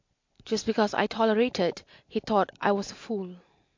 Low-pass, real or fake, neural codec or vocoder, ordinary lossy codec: 7.2 kHz; real; none; MP3, 48 kbps